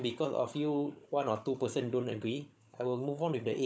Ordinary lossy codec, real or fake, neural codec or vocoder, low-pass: none; fake; codec, 16 kHz, 16 kbps, FunCodec, trained on Chinese and English, 50 frames a second; none